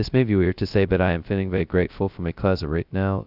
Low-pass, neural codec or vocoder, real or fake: 5.4 kHz; codec, 16 kHz, 0.2 kbps, FocalCodec; fake